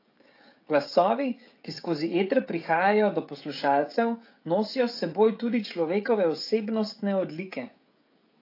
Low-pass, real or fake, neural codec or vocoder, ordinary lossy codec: 5.4 kHz; fake; codec, 16 kHz, 16 kbps, FreqCodec, smaller model; AAC, 32 kbps